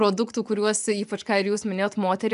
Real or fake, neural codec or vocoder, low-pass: fake; vocoder, 24 kHz, 100 mel bands, Vocos; 10.8 kHz